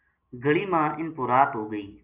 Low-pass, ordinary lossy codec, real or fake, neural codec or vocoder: 3.6 kHz; Opus, 64 kbps; real; none